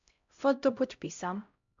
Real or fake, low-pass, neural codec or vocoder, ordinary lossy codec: fake; 7.2 kHz; codec, 16 kHz, 0.5 kbps, X-Codec, HuBERT features, trained on LibriSpeech; MP3, 64 kbps